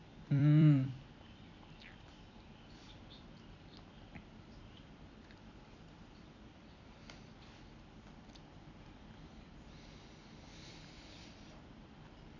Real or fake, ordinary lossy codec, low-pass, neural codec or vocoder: fake; none; 7.2 kHz; vocoder, 44.1 kHz, 128 mel bands every 256 samples, BigVGAN v2